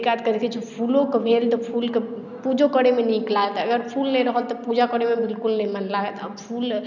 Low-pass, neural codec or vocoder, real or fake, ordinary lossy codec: 7.2 kHz; none; real; none